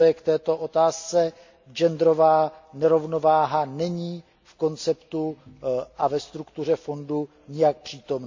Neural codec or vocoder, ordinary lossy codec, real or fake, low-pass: none; MP3, 48 kbps; real; 7.2 kHz